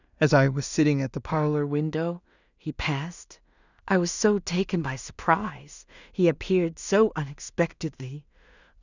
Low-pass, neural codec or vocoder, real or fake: 7.2 kHz; codec, 16 kHz in and 24 kHz out, 0.4 kbps, LongCat-Audio-Codec, two codebook decoder; fake